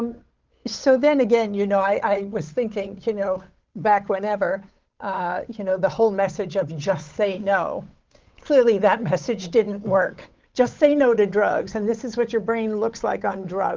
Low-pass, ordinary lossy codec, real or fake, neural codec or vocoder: 7.2 kHz; Opus, 24 kbps; fake; codec, 16 kHz, 8 kbps, FunCodec, trained on Chinese and English, 25 frames a second